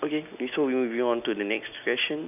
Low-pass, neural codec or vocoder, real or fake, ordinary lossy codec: 3.6 kHz; none; real; none